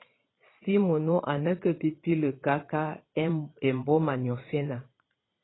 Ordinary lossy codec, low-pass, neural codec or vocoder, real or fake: AAC, 16 kbps; 7.2 kHz; vocoder, 22.05 kHz, 80 mel bands, Vocos; fake